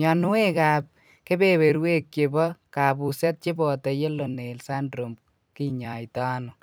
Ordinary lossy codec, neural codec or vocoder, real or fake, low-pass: none; vocoder, 44.1 kHz, 128 mel bands every 256 samples, BigVGAN v2; fake; none